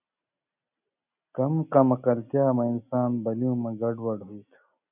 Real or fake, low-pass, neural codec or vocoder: real; 3.6 kHz; none